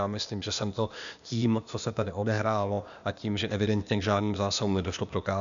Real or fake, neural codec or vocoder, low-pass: fake; codec, 16 kHz, 0.8 kbps, ZipCodec; 7.2 kHz